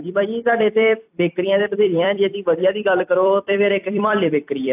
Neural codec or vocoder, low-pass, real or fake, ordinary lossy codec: vocoder, 44.1 kHz, 128 mel bands every 256 samples, BigVGAN v2; 3.6 kHz; fake; AAC, 32 kbps